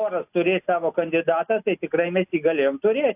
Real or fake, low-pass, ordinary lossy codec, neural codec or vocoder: real; 3.6 kHz; AAC, 32 kbps; none